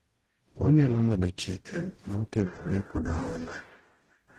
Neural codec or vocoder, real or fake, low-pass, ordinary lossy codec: codec, 44.1 kHz, 0.9 kbps, DAC; fake; 14.4 kHz; Opus, 16 kbps